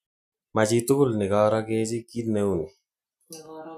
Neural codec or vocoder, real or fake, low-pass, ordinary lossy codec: none; real; 14.4 kHz; none